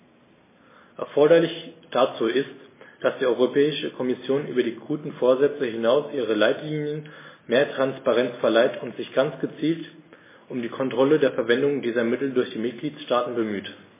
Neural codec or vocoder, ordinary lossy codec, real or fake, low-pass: none; MP3, 16 kbps; real; 3.6 kHz